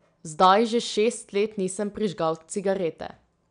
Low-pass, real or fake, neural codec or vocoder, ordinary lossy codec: 9.9 kHz; real; none; none